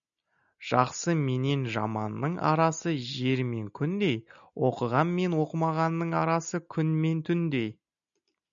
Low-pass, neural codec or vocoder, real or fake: 7.2 kHz; none; real